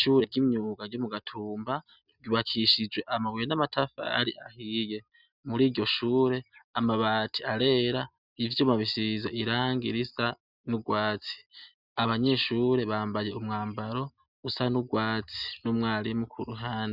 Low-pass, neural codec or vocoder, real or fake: 5.4 kHz; none; real